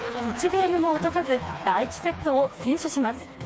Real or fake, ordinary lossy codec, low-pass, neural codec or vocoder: fake; none; none; codec, 16 kHz, 2 kbps, FreqCodec, smaller model